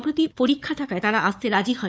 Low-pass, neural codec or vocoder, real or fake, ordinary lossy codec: none; codec, 16 kHz, 4 kbps, FunCodec, trained on Chinese and English, 50 frames a second; fake; none